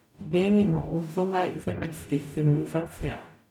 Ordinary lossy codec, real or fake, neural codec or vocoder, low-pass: none; fake; codec, 44.1 kHz, 0.9 kbps, DAC; 19.8 kHz